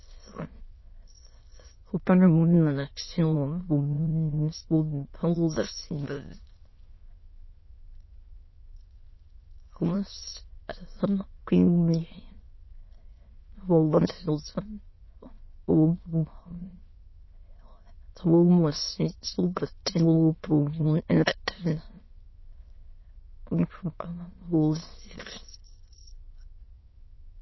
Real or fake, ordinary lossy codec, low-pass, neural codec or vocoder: fake; MP3, 24 kbps; 7.2 kHz; autoencoder, 22.05 kHz, a latent of 192 numbers a frame, VITS, trained on many speakers